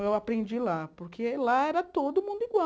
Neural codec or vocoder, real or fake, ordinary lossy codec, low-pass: none; real; none; none